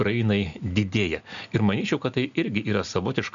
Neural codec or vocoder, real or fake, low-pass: none; real; 7.2 kHz